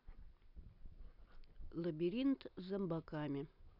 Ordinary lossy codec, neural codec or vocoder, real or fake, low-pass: none; none; real; 5.4 kHz